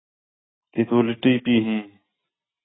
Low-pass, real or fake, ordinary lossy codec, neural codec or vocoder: 7.2 kHz; real; AAC, 16 kbps; none